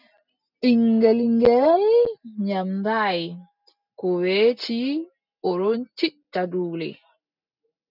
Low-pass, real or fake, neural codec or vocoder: 5.4 kHz; real; none